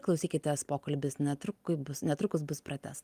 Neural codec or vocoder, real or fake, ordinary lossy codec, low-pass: none; real; Opus, 32 kbps; 14.4 kHz